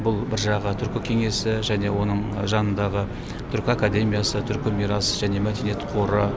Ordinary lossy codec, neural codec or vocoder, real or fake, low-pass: none; none; real; none